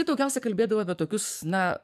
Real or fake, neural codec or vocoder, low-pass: fake; codec, 44.1 kHz, 7.8 kbps, DAC; 14.4 kHz